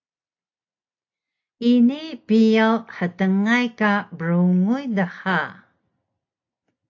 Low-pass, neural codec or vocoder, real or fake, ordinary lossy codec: 7.2 kHz; none; real; AAC, 48 kbps